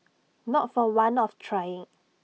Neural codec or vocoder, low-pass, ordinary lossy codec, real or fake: none; none; none; real